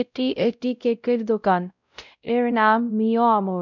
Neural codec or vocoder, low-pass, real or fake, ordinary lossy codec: codec, 16 kHz, 0.5 kbps, X-Codec, WavLM features, trained on Multilingual LibriSpeech; 7.2 kHz; fake; none